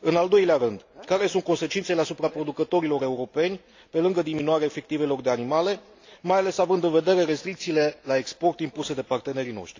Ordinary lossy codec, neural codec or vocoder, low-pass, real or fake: AAC, 48 kbps; none; 7.2 kHz; real